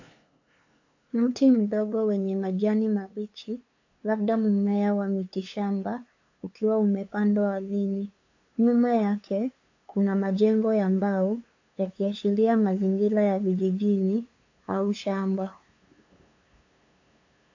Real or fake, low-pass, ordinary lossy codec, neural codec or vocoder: fake; 7.2 kHz; AAC, 48 kbps; codec, 16 kHz, 2 kbps, FunCodec, trained on LibriTTS, 25 frames a second